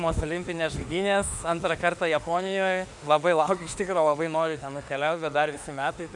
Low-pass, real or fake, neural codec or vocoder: 10.8 kHz; fake; autoencoder, 48 kHz, 32 numbers a frame, DAC-VAE, trained on Japanese speech